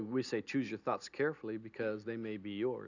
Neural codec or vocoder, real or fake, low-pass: none; real; 7.2 kHz